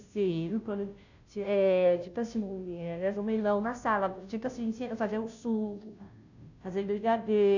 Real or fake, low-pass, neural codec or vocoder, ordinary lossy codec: fake; 7.2 kHz; codec, 16 kHz, 0.5 kbps, FunCodec, trained on Chinese and English, 25 frames a second; none